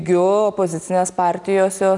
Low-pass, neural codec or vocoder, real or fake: 10.8 kHz; none; real